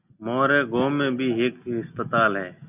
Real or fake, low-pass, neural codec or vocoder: real; 3.6 kHz; none